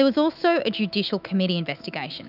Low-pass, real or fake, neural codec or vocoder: 5.4 kHz; fake; autoencoder, 48 kHz, 128 numbers a frame, DAC-VAE, trained on Japanese speech